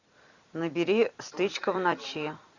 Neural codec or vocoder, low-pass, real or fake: vocoder, 44.1 kHz, 128 mel bands every 256 samples, BigVGAN v2; 7.2 kHz; fake